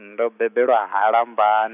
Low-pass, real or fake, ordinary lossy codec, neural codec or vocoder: 3.6 kHz; real; none; none